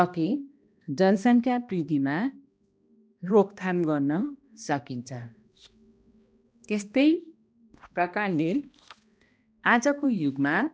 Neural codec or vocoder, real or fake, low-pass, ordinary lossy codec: codec, 16 kHz, 1 kbps, X-Codec, HuBERT features, trained on balanced general audio; fake; none; none